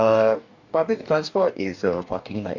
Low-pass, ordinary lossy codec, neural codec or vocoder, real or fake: 7.2 kHz; none; codec, 44.1 kHz, 2.6 kbps, DAC; fake